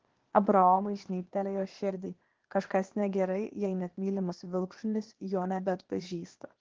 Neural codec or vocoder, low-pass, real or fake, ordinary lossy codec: codec, 16 kHz, 0.8 kbps, ZipCodec; 7.2 kHz; fake; Opus, 16 kbps